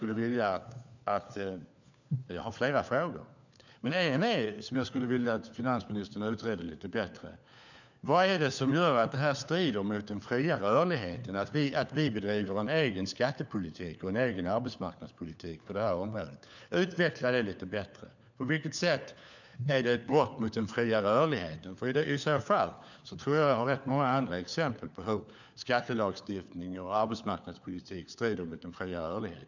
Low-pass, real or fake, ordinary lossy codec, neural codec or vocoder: 7.2 kHz; fake; none; codec, 16 kHz, 4 kbps, FunCodec, trained on LibriTTS, 50 frames a second